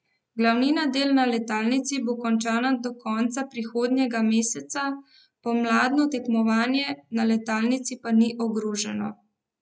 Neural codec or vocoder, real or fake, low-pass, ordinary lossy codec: none; real; none; none